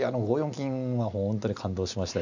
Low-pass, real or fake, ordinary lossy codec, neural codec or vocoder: 7.2 kHz; real; none; none